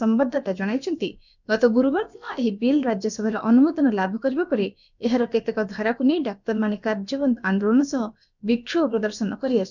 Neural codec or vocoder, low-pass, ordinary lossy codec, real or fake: codec, 16 kHz, about 1 kbps, DyCAST, with the encoder's durations; 7.2 kHz; none; fake